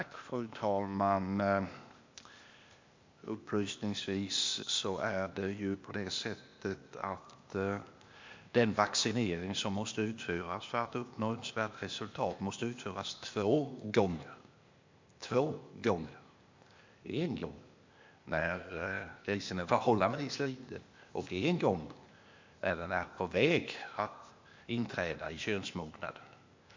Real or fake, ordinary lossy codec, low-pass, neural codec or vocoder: fake; MP3, 48 kbps; 7.2 kHz; codec, 16 kHz, 0.8 kbps, ZipCodec